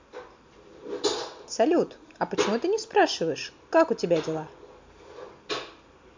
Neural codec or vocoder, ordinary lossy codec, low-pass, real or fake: none; MP3, 48 kbps; 7.2 kHz; real